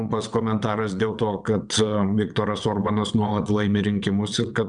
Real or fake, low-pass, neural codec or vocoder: fake; 9.9 kHz; vocoder, 22.05 kHz, 80 mel bands, WaveNeXt